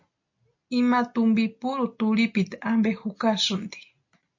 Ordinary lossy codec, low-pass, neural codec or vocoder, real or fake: MP3, 48 kbps; 7.2 kHz; none; real